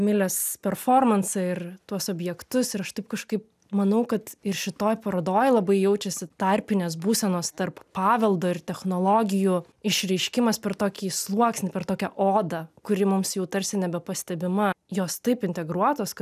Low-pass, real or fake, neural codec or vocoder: 14.4 kHz; real; none